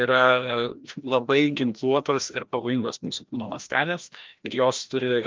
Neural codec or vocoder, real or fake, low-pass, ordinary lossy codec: codec, 16 kHz, 1 kbps, FreqCodec, larger model; fake; 7.2 kHz; Opus, 24 kbps